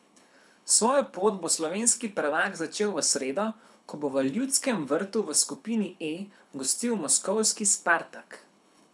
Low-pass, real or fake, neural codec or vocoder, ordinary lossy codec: none; fake; codec, 24 kHz, 6 kbps, HILCodec; none